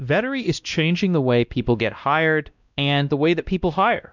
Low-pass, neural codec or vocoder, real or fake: 7.2 kHz; codec, 16 kHz, 1 kbps, X-Codec, WavLM features, trained on Multilingual LibriSpeech; fake